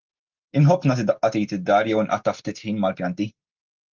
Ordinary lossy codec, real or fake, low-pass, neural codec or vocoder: Opus, 32 kbps; real; 7.2 kHz; none